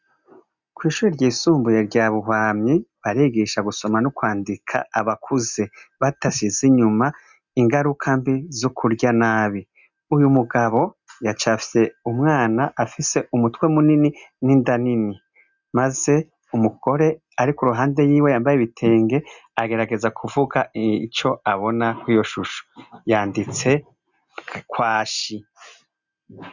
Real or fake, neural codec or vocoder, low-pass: real; none; 7.2 kHz